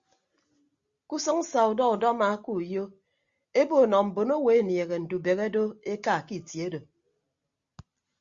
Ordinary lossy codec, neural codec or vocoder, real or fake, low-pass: Opus, 64 kbps; none; real; 7.2 kHz